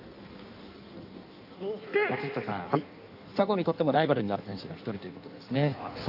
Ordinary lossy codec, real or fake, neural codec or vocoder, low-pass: none; fake; codec, 16 kHz in and 24 kHz out, 1.1 kbps, FireRedTTS-2 codec; 5.4 kHz